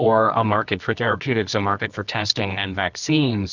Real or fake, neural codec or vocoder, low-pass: fake; codec, 24 kHz, 0.9 kbps, WavTokenizer, medium music audio release; 7.2 kHz